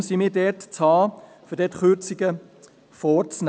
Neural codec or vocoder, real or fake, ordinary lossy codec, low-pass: none; real; none; none